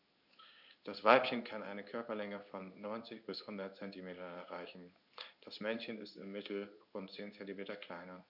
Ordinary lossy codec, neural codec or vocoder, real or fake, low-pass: none; codec, 16 kHz in and 24 kHz out, 1 kbps, XY-Tokenizer; fake; 5.4 kHz